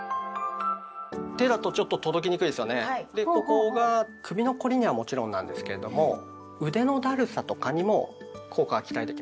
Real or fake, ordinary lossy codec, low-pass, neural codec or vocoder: real; none; none; none